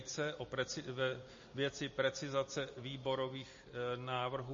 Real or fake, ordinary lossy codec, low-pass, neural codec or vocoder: real; MP3, 32 kbps; 7.2 kHz; none